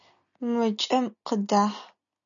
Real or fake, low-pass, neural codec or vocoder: real; 7.2 kHz; none